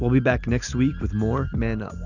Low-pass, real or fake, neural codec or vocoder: 7.2 kHz; real; none